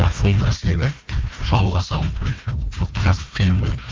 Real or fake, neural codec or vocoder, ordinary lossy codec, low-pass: fake; codec, 16 kHz, 1 kbps, FunCodec, trained on Chinese and English, 50 frames a second; Opus, 24 kbps; 7.2 kHz